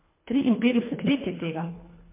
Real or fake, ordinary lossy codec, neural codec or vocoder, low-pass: fake; MP3, 24 kbps; codec, 24 kHz, 1.5 kbps, HILCodec; 3.6 kHz